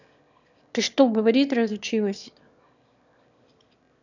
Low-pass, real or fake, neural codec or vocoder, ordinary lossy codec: 7.2 kHz; fake; autoencoder, 22.05 kHz, a latent of 192 numbers a frame, VITS, trained on one speaker; none